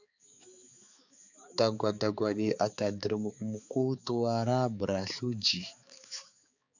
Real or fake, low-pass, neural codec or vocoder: fake; 7.2 kHz; codec, 16 kHz, 4 kbps, X-Codec, HuBERT features, trained on balanced general audio